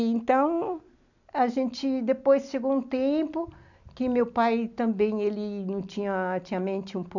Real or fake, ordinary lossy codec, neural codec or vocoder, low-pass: real; none; none; 7.2 kHz